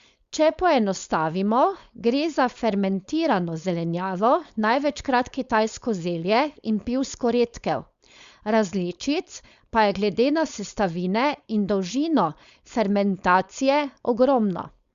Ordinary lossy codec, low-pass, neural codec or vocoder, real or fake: Opus, 64 kbps; 7.2 kHz; codec, 16 kHz, 4.8 kbps, FACodec; fake